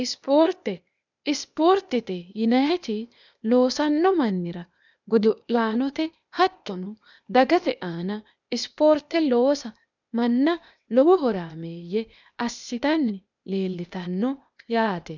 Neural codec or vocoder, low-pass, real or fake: codec, 16 kHz, 0.8 kbps, ZipCodec; 7.2 kHz; fake